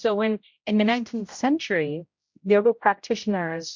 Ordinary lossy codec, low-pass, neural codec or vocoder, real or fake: MP3, 48 kbps; 7.2 kHz; codec, 16 kHz, 0.5 kbps, X-Codec, HuBERT features, trained on general audio; fake